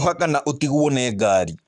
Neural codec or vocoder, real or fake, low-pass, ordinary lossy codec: codec, 44.1 kHz, 7.8 kbps, Pupu-Codec; fake; 10.8 kHz; none